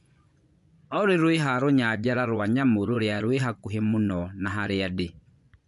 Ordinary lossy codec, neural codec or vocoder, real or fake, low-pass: MP3, 64 kbps; vocoder, 24 kHz, 100 mel bands, Vocos; fake; 10.8 kHz